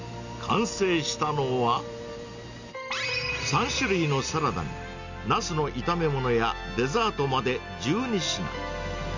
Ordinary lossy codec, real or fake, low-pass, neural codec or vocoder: none; real; 7.2 kHz; none